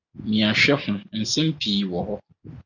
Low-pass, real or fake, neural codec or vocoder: 7.2 kHz; real; none